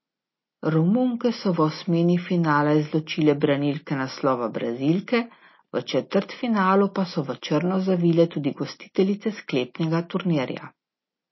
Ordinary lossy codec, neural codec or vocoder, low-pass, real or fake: MP3, 24 kbps; none; 7.2 kHz; real